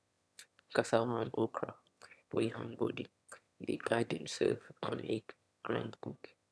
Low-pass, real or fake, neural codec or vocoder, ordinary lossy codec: none; fake; autoencoder, 22.05 kHz, a latent of 192 numbers a frame, VITS, trained on one speaker; none